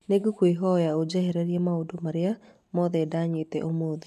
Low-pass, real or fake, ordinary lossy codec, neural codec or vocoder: 14.4 kHz; real; none; none